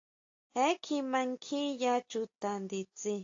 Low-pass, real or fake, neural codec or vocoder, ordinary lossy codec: 7.2 kHz; real; none; AAC, 48 kbps